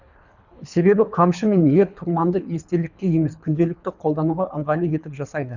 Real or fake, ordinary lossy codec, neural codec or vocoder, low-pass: fake; none; codec, 24 kHz, 3 kbps, HILCodec; 7.2 kHz